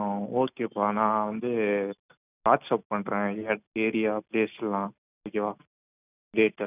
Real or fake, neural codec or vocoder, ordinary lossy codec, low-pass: real; none; none; 3.6 kHz